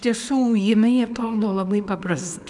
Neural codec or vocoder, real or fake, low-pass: codec, 24 kHz, 0.9 kbps, WavTokenizer, small release; fake; 10.8 kHz